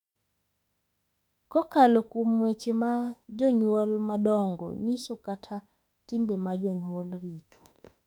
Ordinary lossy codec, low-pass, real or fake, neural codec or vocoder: none; 19.8 kHz; fake; autoencoder, 48 kHz, 32 numbers a frame, DAC-VAE, trained on Japanese speech